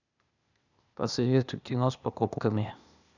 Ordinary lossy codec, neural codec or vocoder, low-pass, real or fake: none; codec, 16 kHz, 0.8 kbps, ZipCodec; 7.2 kHz; fake